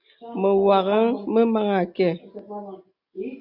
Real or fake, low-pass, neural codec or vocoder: real; 5.4 kHz; none